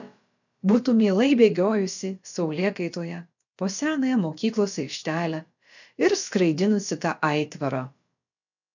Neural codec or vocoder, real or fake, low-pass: codec, 16 kHz, about 1 kbps, DyCAST, with the encoder's durations; fake; 7.2 kHz